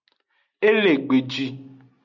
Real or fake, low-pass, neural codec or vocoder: real; 7.2 kHz; none